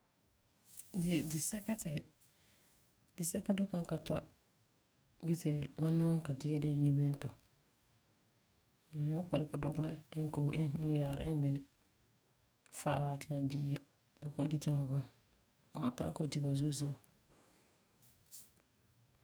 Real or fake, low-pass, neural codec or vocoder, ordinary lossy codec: fake; none; codec, 44.1 kHz, 2.6 kbps, DAC; none